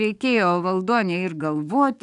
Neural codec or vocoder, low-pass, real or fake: codec, 44.1 kHz, 7.8 kbps, DAC; 10.8 kHz; fake